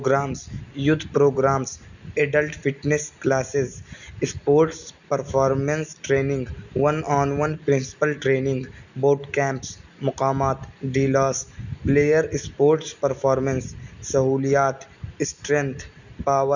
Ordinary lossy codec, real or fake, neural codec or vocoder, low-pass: none; real; none; 7.2 kHz